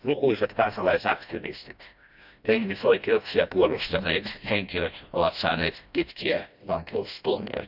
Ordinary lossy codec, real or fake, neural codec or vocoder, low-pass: none; fake; codec, 16 kHz, 1 kbps, FreqCodec, smaller model; 5.4 kHz